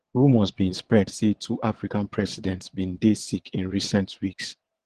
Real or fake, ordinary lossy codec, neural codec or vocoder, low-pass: fake; Opus, 16 kbps; vocoder, 22.05 kHz, 80 mel bands, WaveNeXt; 9.9 kHz